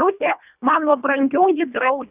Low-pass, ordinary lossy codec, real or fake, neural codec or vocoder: 3.6 kHz; Opus, 64 kbps; fake; codec, 24 kHz, 1.5 kbps, HILCodec